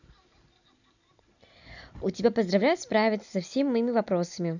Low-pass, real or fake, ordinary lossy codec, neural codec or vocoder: 7.2 kHz; real; none; none